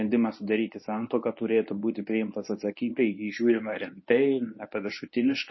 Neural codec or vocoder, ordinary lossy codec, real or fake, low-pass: codec, 24 kHz, 0.9 kbps, WavTokenizer, medium speech release version 1; MP3, 24 kbps; fake; 7.2 kHz